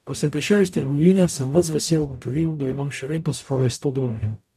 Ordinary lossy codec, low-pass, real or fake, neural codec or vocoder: none; 14.4 kHz; fake; codec, 44.1 kHz, 0.9 kbps, DAC